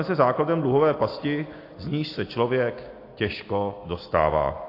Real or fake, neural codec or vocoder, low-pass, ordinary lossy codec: real; none; 5.4 kHz; AAC, 32 kbps